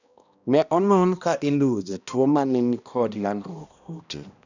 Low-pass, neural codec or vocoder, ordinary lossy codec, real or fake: 7.2 kHz; codec, 16 kHz, 1 kbps, X-Codec, HuBERT features, trained on balanced general audio; none; fake